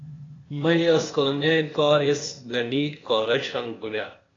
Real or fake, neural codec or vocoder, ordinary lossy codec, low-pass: fake; codec, 16 kHz, 0.8 kbps, ZipCodec; AAC, 32 kbps; 7.2 kHz